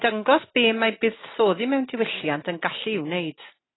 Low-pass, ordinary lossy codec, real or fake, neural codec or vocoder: 7.2 kHz; AAC, 16 kbps; real; none